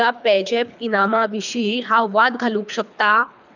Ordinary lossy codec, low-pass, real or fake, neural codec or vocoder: none; 7.2 kHz; fake; codec, 24 kHz, 3 kbps, HILCodec